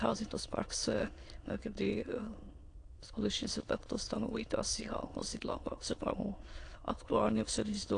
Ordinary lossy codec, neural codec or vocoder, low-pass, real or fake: AAC, 48 kbps; autoencoder, 22.05 kHz, a latent of 192 numbers a frame, VITS, trained on many speakers; 9.9 kHz; fake